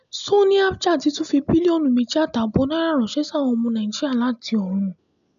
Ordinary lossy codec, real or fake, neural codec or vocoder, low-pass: none; real; none; 7.2 kHz